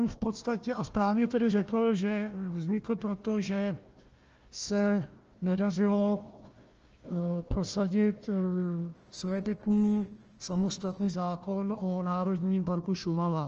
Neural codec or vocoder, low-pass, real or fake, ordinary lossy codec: codec, 16 kHz, 1 kbps, FunCodec, trained on Chinese and English, 50 frames a second; 7.2 kHz; fake; Opus, 24 kbps